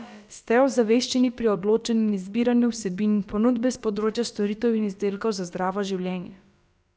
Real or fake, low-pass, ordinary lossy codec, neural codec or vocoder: fake; none; none; codec, 16 kHz, about 1 kbps, DyCAST, with the encoder's durations